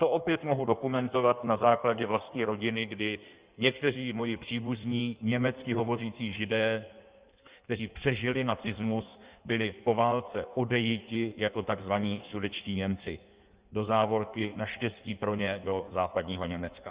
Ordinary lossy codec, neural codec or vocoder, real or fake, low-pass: Opus, 64 kbps; codec, 16 kHz in and 24 kHz out, 1.1 kbps, FireRedTTS-2 codec; fake; 3.6 kHz